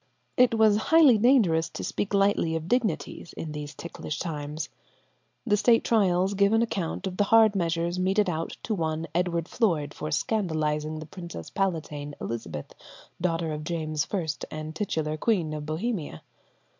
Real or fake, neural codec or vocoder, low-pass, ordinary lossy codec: real; none; 7.2 kHz; MP3, 64 kbps